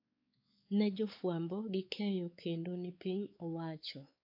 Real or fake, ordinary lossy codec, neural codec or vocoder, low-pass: fake; AAC, 48 kbps; codec, 16 kHz, 4 kbps, X-Codec, WavLM features, trained on Multilingual LibriSpeech; 5.4 kHz